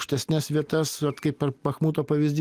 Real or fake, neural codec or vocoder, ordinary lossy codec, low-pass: real; none; Opus, 24 kbps; 14.4 kHz